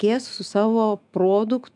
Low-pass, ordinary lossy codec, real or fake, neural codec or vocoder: 10.8 kHz; MP3, 96 kbps; fake; autoencoder, 48 kHz, 128 numbers a frame, DAC-VAE, trained on Japanese speech